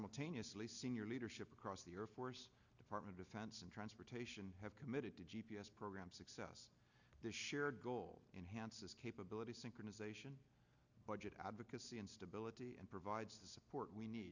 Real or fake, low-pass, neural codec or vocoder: real; 7.2 kHz; none